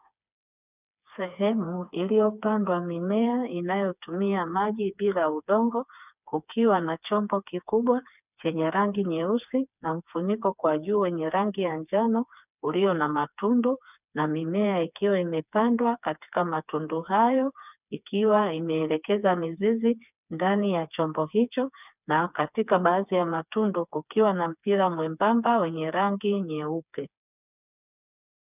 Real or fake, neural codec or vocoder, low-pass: fake; codec, 16 kHz, 4 kbps, FreqCodec, smaller model; 3.6 kHz